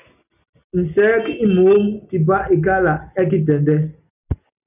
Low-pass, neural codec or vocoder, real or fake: 3.6 kHz; none; real